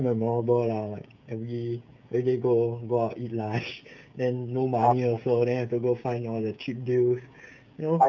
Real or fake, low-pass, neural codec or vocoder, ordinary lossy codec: fake; 7.2 kHz; codec, 16 kHz, 16 kbps, FreqCodec, smaller model; none